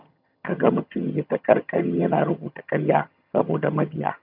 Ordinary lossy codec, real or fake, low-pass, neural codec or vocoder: none; fake; 5.4 kHz; vocoder, 22.05 kHz, 80 mel bands, HiFi-GAN